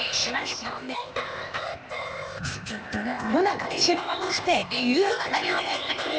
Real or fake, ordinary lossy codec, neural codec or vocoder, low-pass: fake; none; codec, 16 kHz, 0.8 kbps, ZipCodec; none